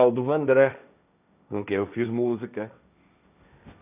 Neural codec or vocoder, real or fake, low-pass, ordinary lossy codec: codec, 16 kHz, 1.1 kbps, Voila-Tokenizer; fake; 3.6 kHz; none